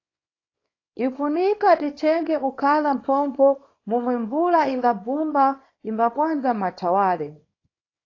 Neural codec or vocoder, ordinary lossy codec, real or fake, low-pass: codec, 24 kHz, 0.9 kbps, WavTokenizer, small release; AAC, 32 kbps; fake; 7.2 kHz